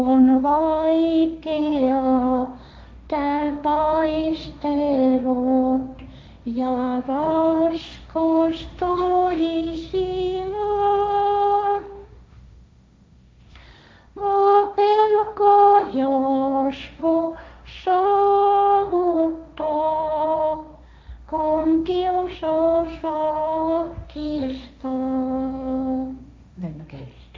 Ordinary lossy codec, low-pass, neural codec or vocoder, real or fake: none; none; codec, 16 kHz, 1.1 kbps, Voila-Tokenizer; fake